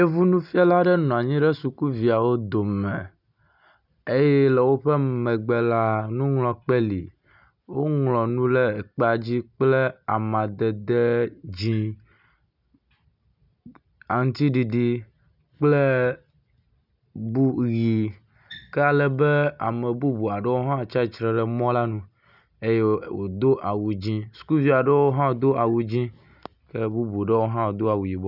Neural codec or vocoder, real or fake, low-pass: none; real; 5.4 kHz